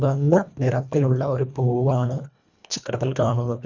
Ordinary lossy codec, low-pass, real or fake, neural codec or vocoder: none; 7.2 kHz; fake; codec, 24 kHz, 1.5 kbps, HILCodec